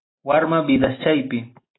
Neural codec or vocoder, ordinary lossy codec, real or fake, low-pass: none; AAC, 16 kbps; real; 7.2 kHz